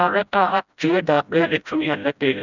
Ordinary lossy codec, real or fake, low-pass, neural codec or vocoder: none; fake; 7.2 kHz; codec, 16 kHz, 0.5 kbps, FreqCodec, smaller model